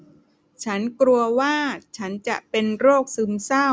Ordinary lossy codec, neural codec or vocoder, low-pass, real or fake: none; none; none; real